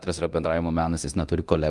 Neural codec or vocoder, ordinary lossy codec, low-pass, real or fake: codec, 24 kHz, 0.9 kbps, DualCodec; Opus, 32 kbps; 10.8 kHz; fake